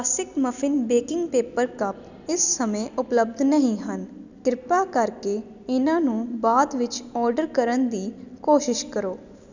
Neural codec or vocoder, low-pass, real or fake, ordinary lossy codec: none; 7.2 kHz; real; none